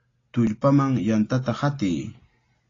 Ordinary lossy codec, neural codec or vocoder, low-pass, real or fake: AAC, 32 kbps; none; 7.2 kHz; real